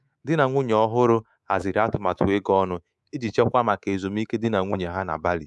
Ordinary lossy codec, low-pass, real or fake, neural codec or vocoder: none; none; fake; codec, 24 kHz, 3.1 kbps, DualCodec